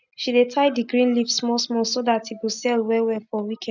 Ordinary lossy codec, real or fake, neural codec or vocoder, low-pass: none; real; none; 7.2 kHz